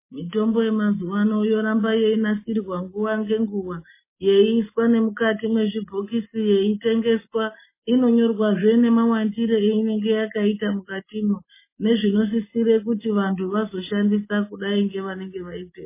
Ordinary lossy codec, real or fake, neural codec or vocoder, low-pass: MP3, 16 kbps; real; none; 3.6 kHz